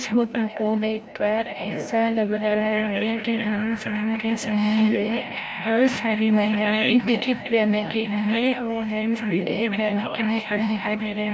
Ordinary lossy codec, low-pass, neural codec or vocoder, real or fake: none; none; codec, 16 kHz, 0.5 kbps, FreqCodec, larger model; fake